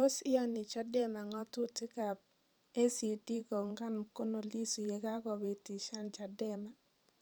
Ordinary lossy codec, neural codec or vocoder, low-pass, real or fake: none; vocoder, 44.1 kHz, 128 mel bands every 512 samples, BigVGAN v2; none; fake